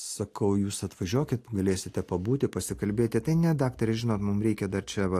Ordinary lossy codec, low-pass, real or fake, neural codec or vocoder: AAC, 64 kbps; 14.4 kHz; real; none